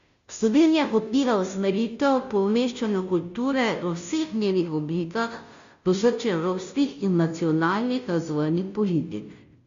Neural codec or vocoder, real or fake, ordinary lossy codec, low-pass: codec, 16 kHz, 0.5 kbps, FunCodec, trained on Chinese and English, 25 frames a second; fake; MP3, 64 kbps; 7.2 kHz